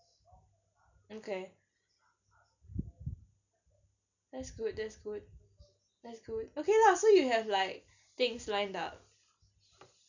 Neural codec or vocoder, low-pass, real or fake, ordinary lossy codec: none; 7.2 kHz; real; none